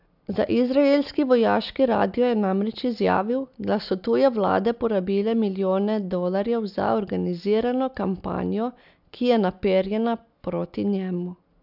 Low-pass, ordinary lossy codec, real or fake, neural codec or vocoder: 5.4 kHz; none; real; none